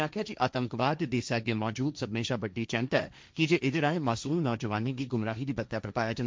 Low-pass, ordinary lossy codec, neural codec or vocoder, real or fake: none; none; codec, 16 kHz, 1.1 kbps, Voila-Tokenizer; fake